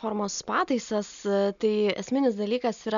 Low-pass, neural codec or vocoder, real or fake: 7.2 kHz; none; real